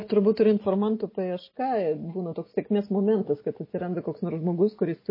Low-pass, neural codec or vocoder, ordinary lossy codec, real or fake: 5.4 kHz; none; MP3, 24 kbps; real